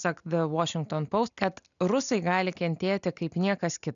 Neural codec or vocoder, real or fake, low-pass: none; real; 7.2 kHz